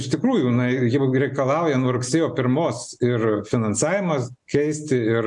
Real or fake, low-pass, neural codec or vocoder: real; 10.8 kHz; none